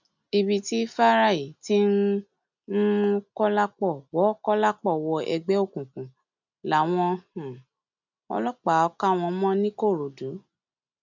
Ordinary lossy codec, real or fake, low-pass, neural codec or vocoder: none; real; 7.2 kHz; none